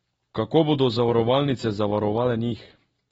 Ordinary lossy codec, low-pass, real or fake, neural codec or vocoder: AAC, 24 kbps; 14.4 kHz; real; none